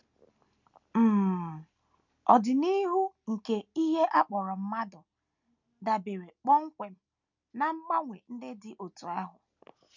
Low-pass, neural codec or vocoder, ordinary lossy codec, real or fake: 7.2 kHz; none; none; real